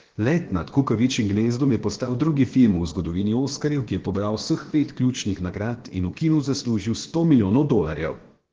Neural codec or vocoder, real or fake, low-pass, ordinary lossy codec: codec, 16 kHz, about 1 kbps, DyCAST, with the encoder's durations; fake; 7.2 kHz; Opus, 16 kbps